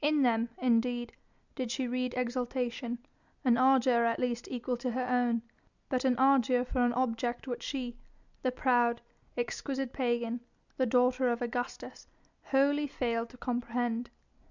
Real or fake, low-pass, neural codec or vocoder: real; 7.2 kHz; none